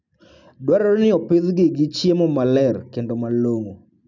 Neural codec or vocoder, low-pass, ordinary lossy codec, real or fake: none; 7.2 kHz; none; real